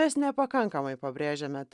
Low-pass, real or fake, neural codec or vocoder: 10.8 kHz; real; none